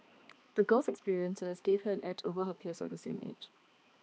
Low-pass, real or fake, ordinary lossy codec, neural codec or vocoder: none; fake; none; codec, 16 kHz, 2 kbps, X-Codec, HuBERT features, trained on balanced general audio